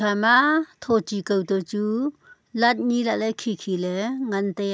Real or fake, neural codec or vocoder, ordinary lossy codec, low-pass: real; none; none; none